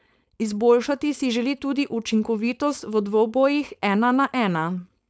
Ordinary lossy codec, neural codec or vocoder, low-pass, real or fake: none; codec, 16 kHz, 4.8 kbps, FACodec; none; fake